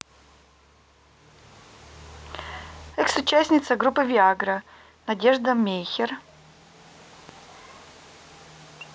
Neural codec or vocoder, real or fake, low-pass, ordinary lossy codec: none; real; none; none